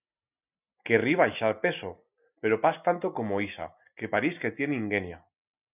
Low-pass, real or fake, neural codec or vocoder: 3.6 kHz; real; none